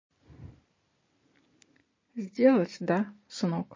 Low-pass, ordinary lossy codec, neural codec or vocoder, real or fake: 7.2 kHz; MP3, 32 kbps; none; real